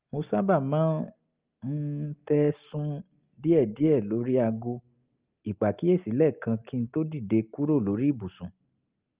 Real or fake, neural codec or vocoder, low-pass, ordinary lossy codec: real; none; 3.6 kHz; Opus, 24 kbps